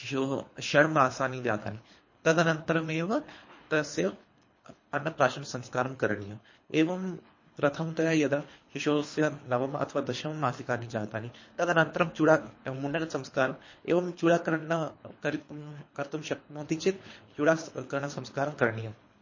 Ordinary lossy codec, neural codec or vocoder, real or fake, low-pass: MP3, 32 kbps; codec, 24 kHz, 3 kbps, HILCodec; fake; 7.2 kHz